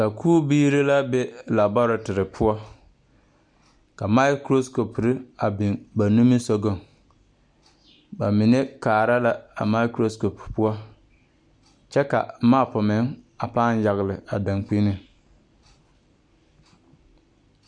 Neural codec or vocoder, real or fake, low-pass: none; real; 9.9 kHz